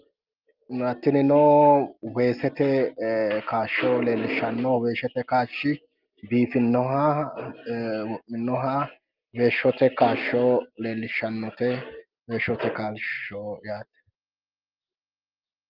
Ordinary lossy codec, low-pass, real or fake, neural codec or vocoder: Opus, 24 kbps; 5.4 kHz; real; none